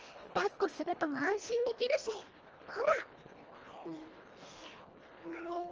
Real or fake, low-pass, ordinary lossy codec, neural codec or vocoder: fake; 7.2 kHz; Opus, 24 kbps; codec, 24 kHz, 1.5 kbps, HILCodec